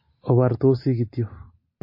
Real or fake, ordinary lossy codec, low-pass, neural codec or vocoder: real; MP3, 24 kbps; 5.4 kHz; none